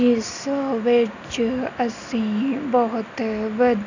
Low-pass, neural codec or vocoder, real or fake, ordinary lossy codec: 7.2 kHz; none; real; none